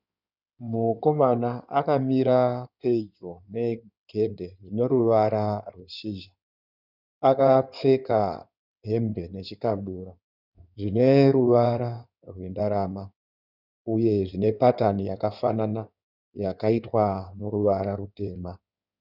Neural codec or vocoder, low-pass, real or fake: codec, 16 kHz in and 24 kHz out, 2.2 kbps, FireRedTTS-2 codec; 5.4 kHz; fake